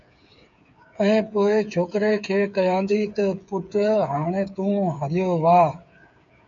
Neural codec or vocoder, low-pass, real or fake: codec, 16 kHz, 8 kbps, FreqCodec, smaller model; 7.2 kHz; fake